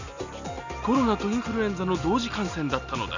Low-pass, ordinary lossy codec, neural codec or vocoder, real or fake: 7.2 kHz; none; none; real